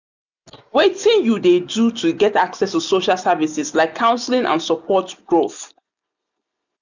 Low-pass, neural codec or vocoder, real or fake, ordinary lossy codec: 7.2 kHz; none; real; none